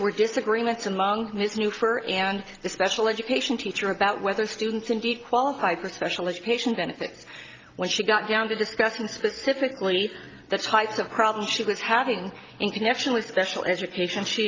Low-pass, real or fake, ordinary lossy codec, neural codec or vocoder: 7.2 kHz; real; Opus, 24 kbps; none